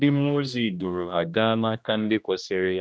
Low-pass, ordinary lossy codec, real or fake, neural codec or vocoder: none; none; fake; codec, 16 kHz, 1 kbps, X-Codec, HuBERT features, trained on general audio